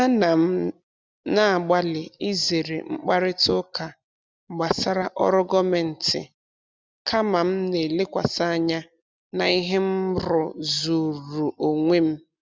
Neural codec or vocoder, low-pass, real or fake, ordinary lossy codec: none; none; real; none